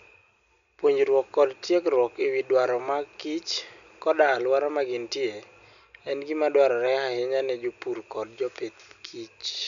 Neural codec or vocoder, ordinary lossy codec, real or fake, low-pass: none; none; real; 7.2 kHz